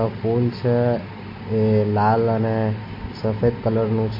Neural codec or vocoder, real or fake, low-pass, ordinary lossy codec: none; real; 5.4 kHz; MP3, 32 kbps